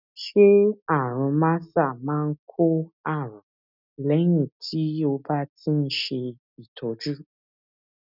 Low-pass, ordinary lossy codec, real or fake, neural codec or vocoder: 5.4 kHz; none; real; none